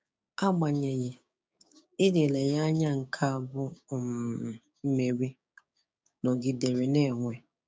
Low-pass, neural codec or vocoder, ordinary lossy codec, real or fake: none; codec, 16 kHz, 6 kbps, DAC; none; fake